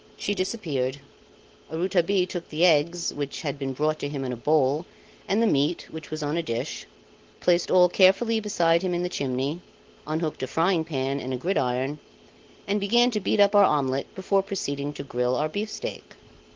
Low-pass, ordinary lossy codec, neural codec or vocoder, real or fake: 7.2 kHz; Opus, 16 kbps; none; real